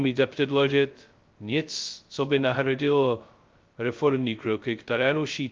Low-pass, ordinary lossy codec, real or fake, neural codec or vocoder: 7.2 kHz; Opus, 24 kbps; fake; codec, 16 kHz, 0.2 kbps, FocalCodec